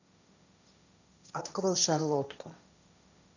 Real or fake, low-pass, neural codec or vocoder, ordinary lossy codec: fake; 7.2 kHz; codec, 16 kHz, 1.1 kbps, Voila-Tokenizer; none